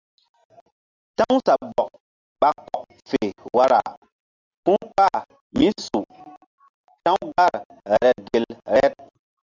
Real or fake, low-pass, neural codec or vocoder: real; 7.2 kHz; none